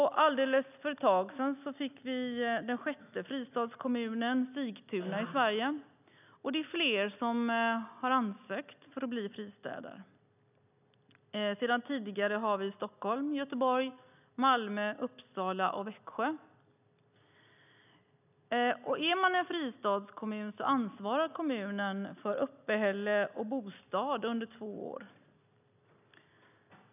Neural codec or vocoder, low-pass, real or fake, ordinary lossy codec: none; 3.6 kHz; real; none